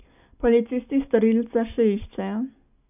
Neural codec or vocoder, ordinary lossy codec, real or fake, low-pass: none; none; real; 3.6 kHz